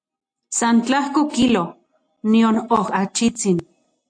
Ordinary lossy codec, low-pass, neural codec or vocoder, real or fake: AAC, 48 kbps; 9.9 kHz; none; real